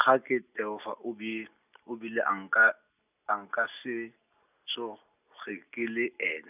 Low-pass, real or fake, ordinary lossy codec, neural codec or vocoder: 3.6 kHz; real; none; none